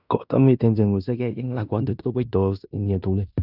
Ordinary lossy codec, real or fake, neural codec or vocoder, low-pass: none; fake; codec, 16 kHz in and 24 kHz out, 0.9 kbps, LongCat-Audio-Codec, fine tuned four codebook decoder; 5.4 kHz